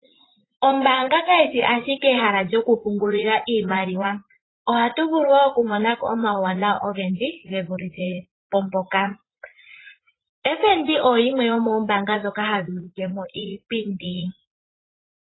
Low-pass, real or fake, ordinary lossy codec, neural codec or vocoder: 7.2 kHz; fake; AAC, 16 kbps; vocoder, 44.1 kHz, 80 mel bands, Vocos